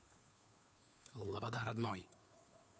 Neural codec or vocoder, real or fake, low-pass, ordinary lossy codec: codec, 16 kHz, 2 kbps, FunCodec, trained on Chinese and English, 25 frames a second; fake; none; none